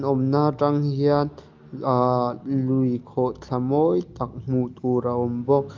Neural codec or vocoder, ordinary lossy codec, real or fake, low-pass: none; Opus, 32 kbps; real; 7.2 kHz